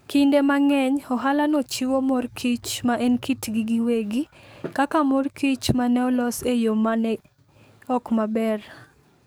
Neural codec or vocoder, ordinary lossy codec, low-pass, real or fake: codec, 44.1 kHz, 7.8 kbps, DAC; none; none; fake